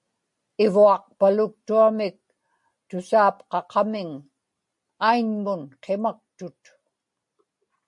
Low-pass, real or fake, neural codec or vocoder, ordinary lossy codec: 10.8 kHz; real; none; MP3, 48 kbps